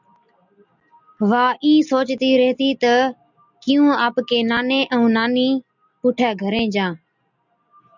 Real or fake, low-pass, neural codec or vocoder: real; 7.2 kHz; none